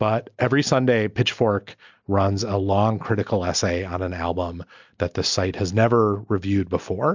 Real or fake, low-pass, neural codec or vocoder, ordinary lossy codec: real; 7.2 kHz; none; MP3, 64 kbps